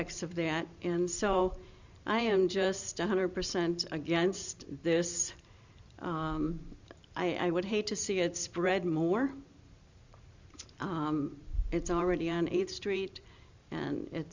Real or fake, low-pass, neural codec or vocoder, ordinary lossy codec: fake; 7.2 kHz; vocoder, 44.1 kHz, 128 mel bands every 512 samples, BigVGAN v2; Opus, 64 kbps